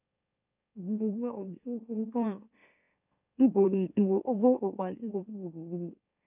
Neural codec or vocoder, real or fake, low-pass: autoencoder, 44.1 kHz, a latent of 192 numbers a frame, MeloTTS; fake; 3.6 kHz